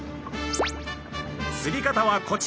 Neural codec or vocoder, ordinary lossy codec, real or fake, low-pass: none; none; real; none